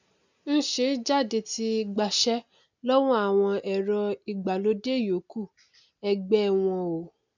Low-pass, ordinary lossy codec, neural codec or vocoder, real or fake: 7.2 kHz; none; none; real